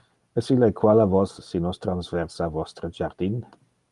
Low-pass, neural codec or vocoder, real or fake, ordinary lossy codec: 10.8 kHz; none; real; Opus, 32 kbps